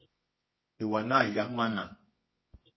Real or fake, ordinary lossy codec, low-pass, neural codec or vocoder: fake; MP3, 24 kbps; 7.2 kHz; codec, 24 kHz, 0.9 kbps, WavTokenizer, medium music audio release